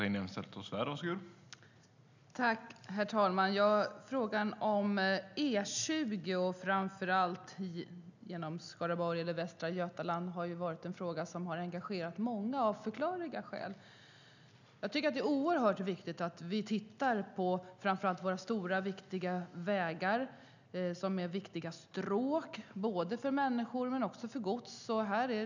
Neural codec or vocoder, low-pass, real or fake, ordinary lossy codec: none; 7.2 kHz; real; none